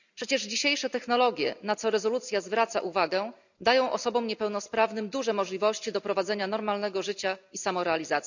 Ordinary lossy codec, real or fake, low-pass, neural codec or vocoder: none; real; 7.2 kHz; none